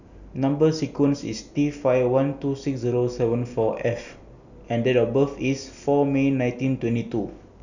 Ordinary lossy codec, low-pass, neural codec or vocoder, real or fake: none; 7.2 kHz; none; real